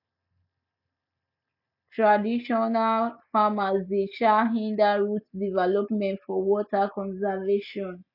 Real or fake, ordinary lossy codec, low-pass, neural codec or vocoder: real; none; 5.4 kHz; none